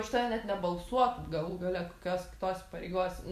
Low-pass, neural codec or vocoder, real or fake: 14.4 kHz; none; real